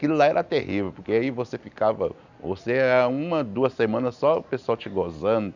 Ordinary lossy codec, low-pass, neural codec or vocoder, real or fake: none; 7.2 kHz; none; real